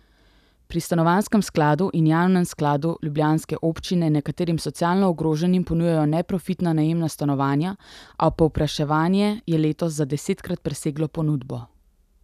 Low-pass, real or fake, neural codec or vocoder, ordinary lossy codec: 14.4 kHz; real; none; none